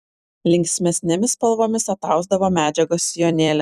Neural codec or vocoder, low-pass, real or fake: vocoder, 44.1 kHz, 128 mel bands every 256 samples, BigVGAN v2; 14.4 kHz; fake